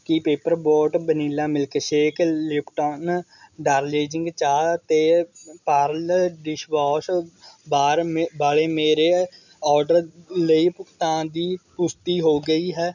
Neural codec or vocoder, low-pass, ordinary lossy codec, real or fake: none; 7.2 kHz; none; real